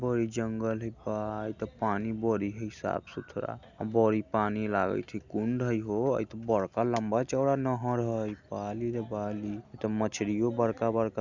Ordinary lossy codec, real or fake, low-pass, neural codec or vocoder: Opus, 64 kbps; real; 7.2 kHz; none